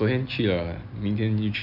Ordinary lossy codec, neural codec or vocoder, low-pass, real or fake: none; none; 5.4 kHz; real